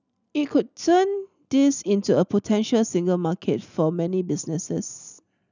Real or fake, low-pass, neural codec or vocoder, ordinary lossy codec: real; 7.2 kHz; none; none